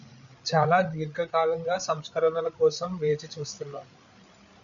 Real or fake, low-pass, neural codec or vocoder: fake; 7.2 kHz; codec, 16 kHz, 8 kbps, FreqCodec, larger model